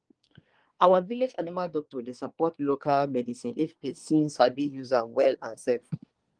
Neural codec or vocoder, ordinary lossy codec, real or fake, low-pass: codec, 24 kHz, 1 kbps, SNAC; Opus, 24 kbps; fake; 9.9 kHz